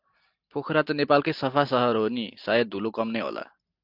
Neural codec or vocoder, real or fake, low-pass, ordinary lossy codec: none; real; 5.4 kHz; AAC, 48 kbps